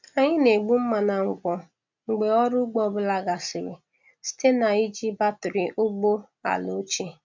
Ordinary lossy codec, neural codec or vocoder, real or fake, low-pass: MP3, 64 kbps; none; real; 7.2 kHz